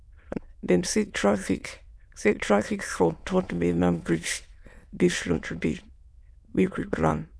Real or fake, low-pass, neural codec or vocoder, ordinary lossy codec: fake; none; autoencoder, 22.05 kHz, a latent of 192 numbers a frame, VITS, trained on many speakers; none